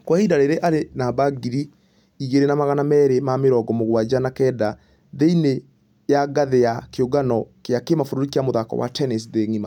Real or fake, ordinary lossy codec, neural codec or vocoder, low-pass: real; none; none; 19.8 kHz